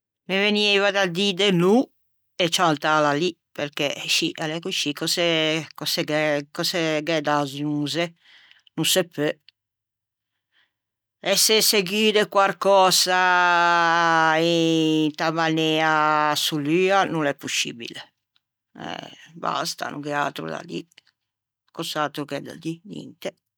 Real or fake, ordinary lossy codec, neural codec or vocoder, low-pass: real; none; none; none